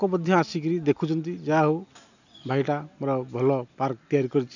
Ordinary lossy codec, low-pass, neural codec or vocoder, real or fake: none; 7.2 kHz; none; real